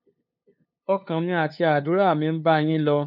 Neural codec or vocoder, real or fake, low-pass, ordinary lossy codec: codec, 16 kHz, 2 kbps, FunCodec, trained on LibriTTS, 25 frames a second; fake; 5.4 kHz; none